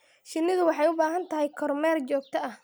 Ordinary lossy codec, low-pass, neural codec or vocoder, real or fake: none; none; none; real